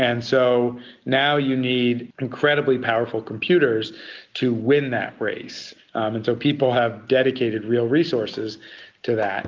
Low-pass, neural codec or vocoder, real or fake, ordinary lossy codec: 7.2 kHz; none; real; Opus, 32 kbps